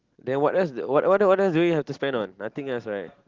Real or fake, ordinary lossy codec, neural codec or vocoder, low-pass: real; Opus, 16 kbps; none; 7.2 kHz